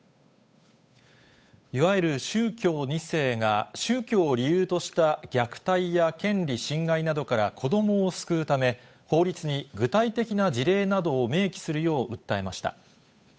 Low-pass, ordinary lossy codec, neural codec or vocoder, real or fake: none; none; codec, 16 kHz, 8 kbps, FunCodec, trained on Chinese and English, 25 frames a second; fake